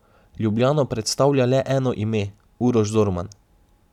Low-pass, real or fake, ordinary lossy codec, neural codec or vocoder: 19.8 kHz; real; none; none